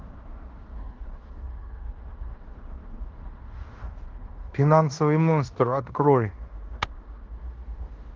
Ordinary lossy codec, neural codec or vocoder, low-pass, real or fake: Opus, 16 kbps; codec, 16 kHz in and 24 kHz out, 0.9 kbps, LongCat-Audio-Codec, fine tuned four codebook decoder; 7.2 kHz; fake